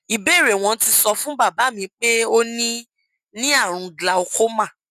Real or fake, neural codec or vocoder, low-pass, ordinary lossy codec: real; none; 14.4 kHz; none